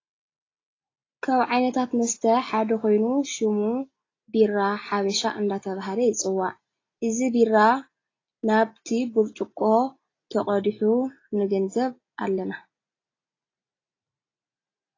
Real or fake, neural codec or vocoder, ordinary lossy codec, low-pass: real; none; AAC, 32 kbps; 7.2 kHz